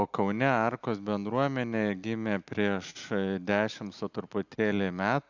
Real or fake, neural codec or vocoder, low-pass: real; none; 7.2 kHz